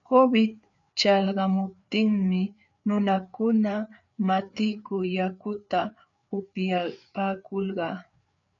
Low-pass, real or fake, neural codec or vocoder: 7.2 kHz; fake; codec, 16 kHz, 4 kbps, FreqCodec, larger model